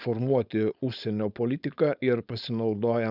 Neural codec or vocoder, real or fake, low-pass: codec, 16 kHz, 4.8 kbps, FACodec; fake; 5.4 kHz